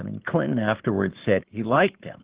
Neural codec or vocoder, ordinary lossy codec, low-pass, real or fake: codec, 44.1 kHz, 7.8 kbps, Pupu-Codec; Opus, 16 kbps; 3.6 kHz; fake